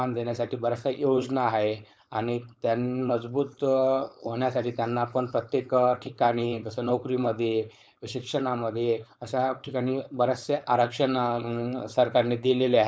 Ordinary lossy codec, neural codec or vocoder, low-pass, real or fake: none; codec, 16 kHz, 4.8 kbps, FACodec; none; fake